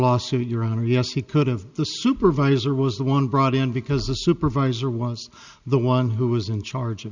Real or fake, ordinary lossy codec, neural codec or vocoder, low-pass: real; Opus, 64 kbps; none; 7.2 kHz